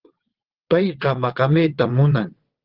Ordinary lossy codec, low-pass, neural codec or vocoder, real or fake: Opus, 16 kbps; 5.4 kHz; vocoder, 22.05 kHz, 80 mel bands, WaveNeXt; fake